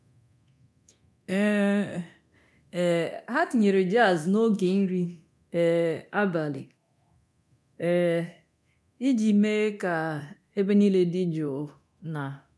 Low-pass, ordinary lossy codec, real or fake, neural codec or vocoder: none; none; fake; codec, 24 kHz, 0.9 kbps, DualCodec